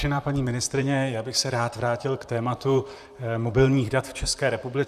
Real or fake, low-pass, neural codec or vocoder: fake; 14.4 kHz; vocoder, 44.1 kHz, 128 mel bands, Pupu-Vocoder